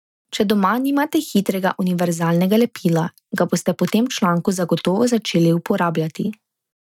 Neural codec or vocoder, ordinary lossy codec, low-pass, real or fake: none; none; 19.8 kHz; real